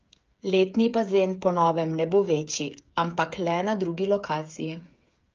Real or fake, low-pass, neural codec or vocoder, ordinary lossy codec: fake; 7.2 kHz; codec, 16 kHz, 8 kbps, FreqCodec, smaller model; Opus, 24 kbps